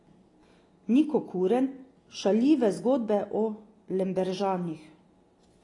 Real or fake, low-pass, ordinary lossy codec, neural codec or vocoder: real; 10.8 kHz; AAC, 32 kbps; none